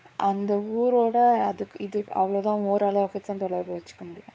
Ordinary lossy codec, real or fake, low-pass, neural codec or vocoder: none; fake; none; codec, 16 kHz, 4 kbps, X-Codec, WavLM features, trained on Multilingual LibriSpeech